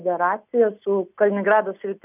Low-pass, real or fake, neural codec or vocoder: 3.6 kHz; real; none